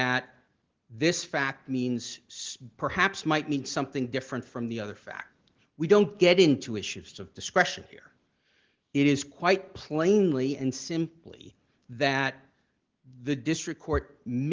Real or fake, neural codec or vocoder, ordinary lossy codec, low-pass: real; none; Opus, 16 kbps; 7.2 kHz